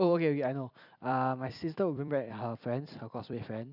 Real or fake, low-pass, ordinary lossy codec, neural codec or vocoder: fake; 5.4 kHz; none; vocoder, 44.1 kHz, 128 mel bands every 256 samples, BigVGAN v2